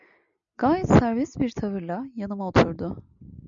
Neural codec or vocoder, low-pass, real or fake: none; 7.2 kHz; real